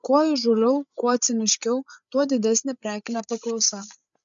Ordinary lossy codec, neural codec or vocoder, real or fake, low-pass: MP3, 96 kbps; codec, 16 kHz, 8 kbps, FreqCodec, larger model; fake; 7.2 kHz